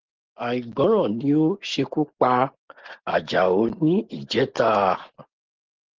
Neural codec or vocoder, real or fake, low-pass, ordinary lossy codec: none; real; 7.2 kHz; Opus, 16 kbps